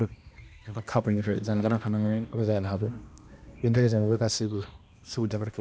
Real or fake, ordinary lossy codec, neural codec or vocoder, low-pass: fake; none; codec, 16 kHz, 1 kbps, X-Codec, HuBERT features, trained on balanced general audio; none